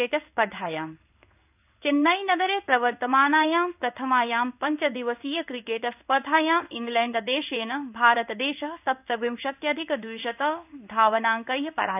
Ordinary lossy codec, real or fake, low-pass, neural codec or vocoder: none; fake; 3.6 kHz; codec, 16 kHz in and 24 kHz out, 1 kbps, XY-Tokenizer